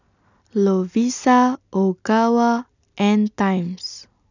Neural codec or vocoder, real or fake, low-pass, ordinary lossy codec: none; real; 7.2 kHz; none